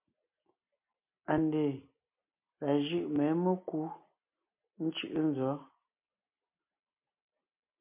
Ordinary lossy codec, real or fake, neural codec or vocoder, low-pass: MP3, 32 kbps; real; none; 3.6 kHz